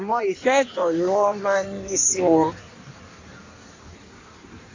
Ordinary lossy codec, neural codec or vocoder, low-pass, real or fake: AAC, 32 kbps; codec, 16 kHz in and 24 kHz out, 1.1 kbps, FireRedTTS-2 codec; 7.2 kHz; fake